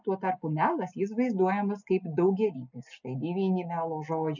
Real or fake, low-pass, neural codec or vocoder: real; 7.2 kHz; none